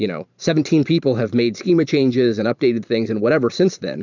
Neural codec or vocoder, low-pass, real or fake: vocoder, 44.1 kHz, 128 mel bands every 512 samples, BigVGAN v2; 7.2 kHz; fake